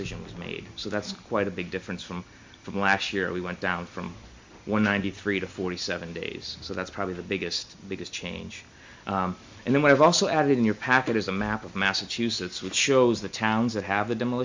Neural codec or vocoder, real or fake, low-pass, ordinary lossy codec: none; real; 7.2 kHz; MP3, 64 kbps